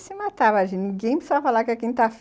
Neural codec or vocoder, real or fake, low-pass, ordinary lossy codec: none; real; none; none